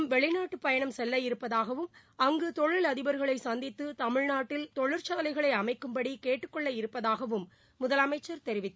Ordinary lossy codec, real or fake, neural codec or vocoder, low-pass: none; real; none; none